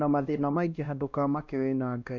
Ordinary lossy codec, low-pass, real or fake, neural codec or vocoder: none; 7.2 kHz; fake; codec, 16 kHz, 1 kbps, X-Codec, WavLM features, trained on Multilingual LibriSpeech